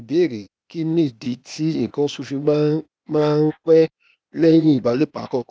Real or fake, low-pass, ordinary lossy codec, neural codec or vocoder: fake; none; none; codec, 16 kHz, 0.8 kbps, ZipCodec